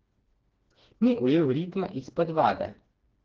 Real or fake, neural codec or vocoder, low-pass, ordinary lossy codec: fake; codec, 16 kHz, 2 kbps, FreqCodec, smaller model; 7.2 kHz; Opus, 16 kbps